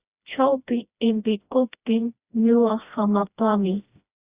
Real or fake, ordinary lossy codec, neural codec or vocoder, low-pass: fake; Opus, 64 kbps; codec, 16 kHz, 1 kbps, FreqCodec, smaller model; 3.6 kHz